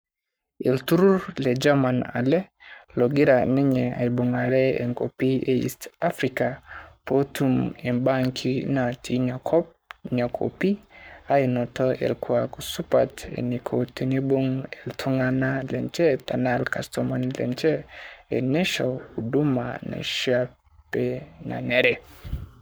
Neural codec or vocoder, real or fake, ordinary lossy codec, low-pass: codec, 44.1 kHz, 7.8 kbps, Pupu-Codec; fake; none; none